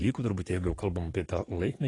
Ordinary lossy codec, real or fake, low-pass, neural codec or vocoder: AAC, 32 kbps; fake; 10.8 kHz; codec, 44.1 kHz, 7.8 kbps, DAC